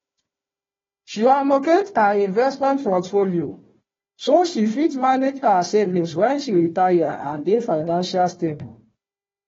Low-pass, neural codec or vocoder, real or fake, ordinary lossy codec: 7.2 kHz; codec, 16 kHz, 1 kbps, FunCodec, trained on Chinese and English, 50 frames a second; fake; AAC, 24 kbps